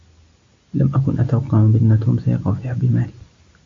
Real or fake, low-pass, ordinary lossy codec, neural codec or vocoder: real; 7.2 kHz; AAC, 48 kbps; none